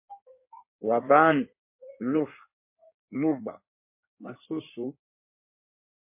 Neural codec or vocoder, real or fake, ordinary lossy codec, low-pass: codec, 16 kHz in and 24 kHz out, 1.1 kbps, FireRedTTS-2 codec; fake; MP3, 24 kbps; 3.6 kHz